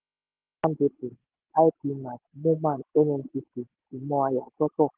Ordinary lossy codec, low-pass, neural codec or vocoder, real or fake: Opus, 16 kbps; 3.6 kHz; none; real